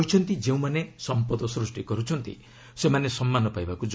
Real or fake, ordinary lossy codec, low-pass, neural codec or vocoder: real; none; none; none